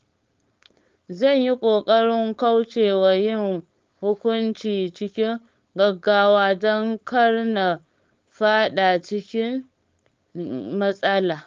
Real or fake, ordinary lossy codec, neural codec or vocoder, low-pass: fake; Opus, 32 kbps; codec, 16 kHz, 4.8 kbps, FACodec; 7.2 kHz